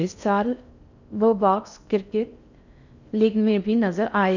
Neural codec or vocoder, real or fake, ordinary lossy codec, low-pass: codec, 16 kHz in and 24 kHz out, 0.6 kbps, FocalCodec, streaming, 4096 codes; fake; none; 7.2 kHz